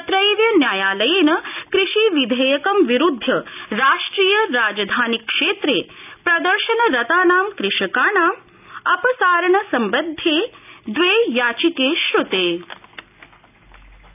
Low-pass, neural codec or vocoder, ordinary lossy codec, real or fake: 3.6 kHz; none; none; real